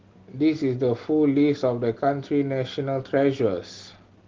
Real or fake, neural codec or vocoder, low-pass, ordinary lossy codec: real; none; 7.2 kHz; Opus, 16 kbps